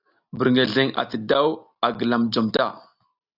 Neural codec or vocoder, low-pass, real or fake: none; 5.4 kHz; real